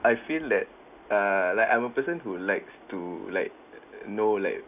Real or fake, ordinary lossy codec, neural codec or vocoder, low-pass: real; none; none; 3.6 kHz